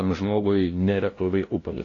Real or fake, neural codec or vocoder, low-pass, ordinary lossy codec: fake; codec, 16 kHz, 0.5 kbps, FunCodec, trained on LibriTTS, 25 frames a second; 7.2 kHz; AAC, 32 kbps